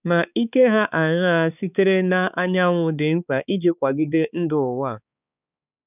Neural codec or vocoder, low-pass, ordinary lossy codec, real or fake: codec, 16 kHz, 4 kbps, X-Codec, HuBERT features, trained on balanced general audio; 3.6 kHz; none; fake